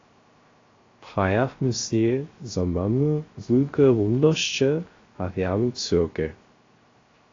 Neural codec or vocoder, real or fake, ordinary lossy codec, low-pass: codec, 16 kHz, 0.3 kbps, FocalCodec; fake; AAC, 32 kbps; 7.2 kHz